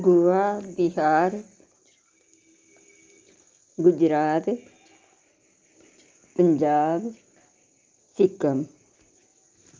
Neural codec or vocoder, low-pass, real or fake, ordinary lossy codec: none; 7.2 kHz; real; Opus, 32 kbps